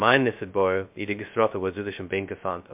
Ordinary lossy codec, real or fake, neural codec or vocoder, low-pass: MP3, 32 kbps; fake; codec, 16 kHz, 0.2 kbps, FocalCodec; 3.6 kHz